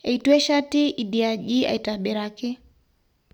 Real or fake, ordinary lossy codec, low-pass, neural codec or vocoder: real; none; 19.8 kHz; none